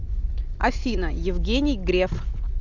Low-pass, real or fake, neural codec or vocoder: 7.2 kHz; real; none